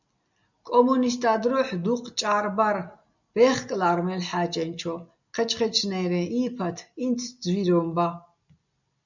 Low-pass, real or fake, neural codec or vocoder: 7.2 kHz; real; none